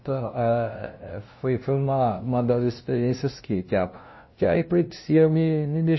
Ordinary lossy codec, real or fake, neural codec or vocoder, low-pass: MP3, 24 kbps; fake; codec, 16 kHz, 0.5 kbps, FunCodec, trained on LibriTTS, 25 frames a second; 7.2 kHz